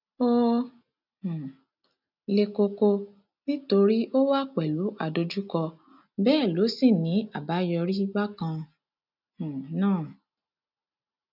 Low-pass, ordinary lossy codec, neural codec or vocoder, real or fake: 5.4 kHz; none; none; real